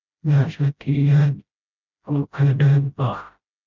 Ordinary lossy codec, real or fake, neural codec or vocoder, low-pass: MP3, 64 kbps; fake; codec, 16 kHz, 0.5 kbps, FreqCodec, smaller model; 7.2 kHz